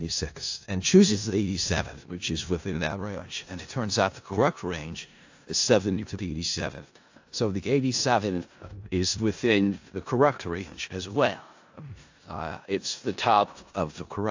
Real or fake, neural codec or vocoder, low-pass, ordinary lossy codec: fake; codec, 16 kHz in and 24 kHz out, 0.4 kbps, LongCat-Audio-Codec, four codebook decoder; 7.2 kHz; AAC, 48 kbps